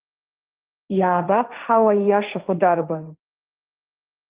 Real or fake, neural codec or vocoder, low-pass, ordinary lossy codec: fake; codec, 16 kHz, 1.1 kbps, Voila-Tokenizer; 3.6 kHz; Opus, 32 kbps